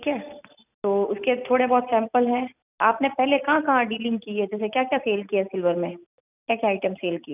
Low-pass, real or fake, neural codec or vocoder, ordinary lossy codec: 3.6 kHz; real; none; none